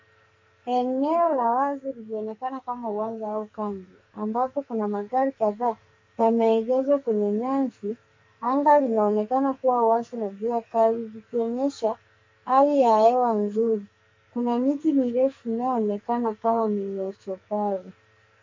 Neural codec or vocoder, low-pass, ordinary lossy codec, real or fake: codec, 44.1 kHz, 2.6 kbps, SNAC; 7.2 kHz; MP3, 48 kbps; fake